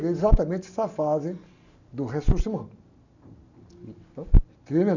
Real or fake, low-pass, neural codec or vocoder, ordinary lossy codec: real; 7.2 kHz; none; none